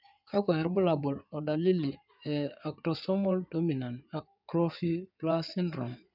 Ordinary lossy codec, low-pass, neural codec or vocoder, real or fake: none; 5.4 kHz; codec, 16 kHz in and 24 kHz out, 2.2 kbps, FireRedTTS-2 codec; fake